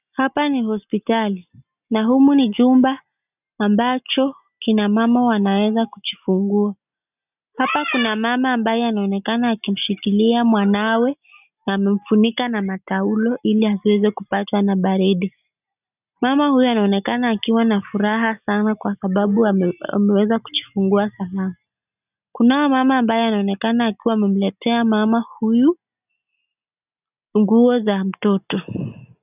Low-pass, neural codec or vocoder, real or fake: 3.6 kHz; none; real